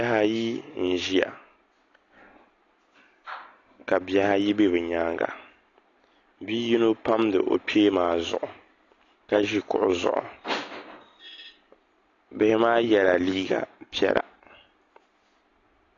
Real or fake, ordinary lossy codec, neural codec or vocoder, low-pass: real; AAC, 32 kbps; none; 7.2 kHz